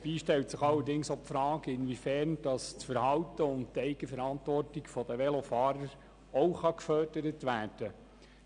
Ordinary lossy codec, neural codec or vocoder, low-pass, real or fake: none; none; 9.9 kHz; real